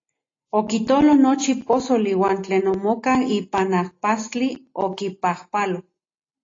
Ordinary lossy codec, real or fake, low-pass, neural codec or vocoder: AAC, 32 kbps; real; 7.2 kHz; none